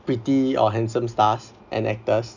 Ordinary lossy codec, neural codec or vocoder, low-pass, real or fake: none; none; 7.2 kHz; real